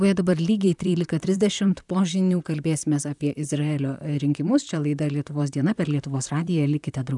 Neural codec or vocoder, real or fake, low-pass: vocoder, 44.1 kHz, 128 mel bands, Pupu-Vocoder; fake; 10.8 kHz